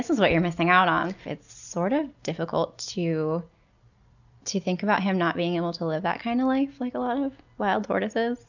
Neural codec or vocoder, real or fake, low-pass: none; real; 7.2 kHz